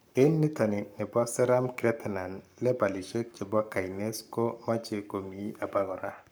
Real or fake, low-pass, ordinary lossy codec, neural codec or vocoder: fake; none; none; codec, 44.1 kHz, 7.8 kbps, Pupu-Codec